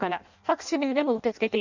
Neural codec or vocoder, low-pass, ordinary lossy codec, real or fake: codec, 16 kHz in and 24 kHz out, 0.6 kbps, FireRedTTS-2 codec; 7.2 kHz; none; fake